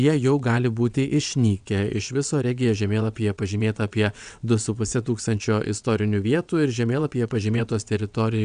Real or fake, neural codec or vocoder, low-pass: fake; vocoder, 22.05 kHz, 80 mel bands, WaveNeXt; 9.9 kHz